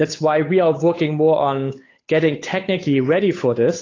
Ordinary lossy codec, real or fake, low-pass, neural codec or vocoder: AAC, 32 kbps; fake; 7.2 kHz; codec, 16 kHz, 8 kbps, FunCodec, trained on Chinese and English, 25 frames a second